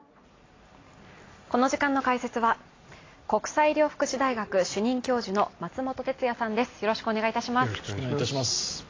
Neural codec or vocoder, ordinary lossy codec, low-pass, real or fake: none; AAC, 32 kbps; 7.2 kHz; real